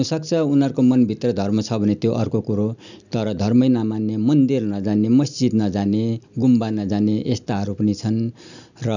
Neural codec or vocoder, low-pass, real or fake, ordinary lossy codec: none; 7.2 kHz; real; none